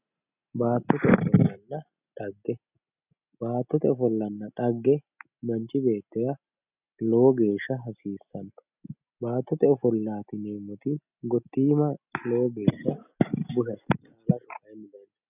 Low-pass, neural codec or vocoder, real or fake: 3.6 kHz; none; real